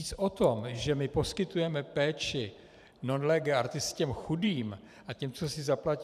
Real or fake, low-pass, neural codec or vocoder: fake; 14.4 kHz; vocoder, 44.1 kHz, 128 mel bands every 512 samples, BigVGAN v2